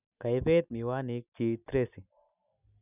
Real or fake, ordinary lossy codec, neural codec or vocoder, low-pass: real; none; none; 3.6 kHz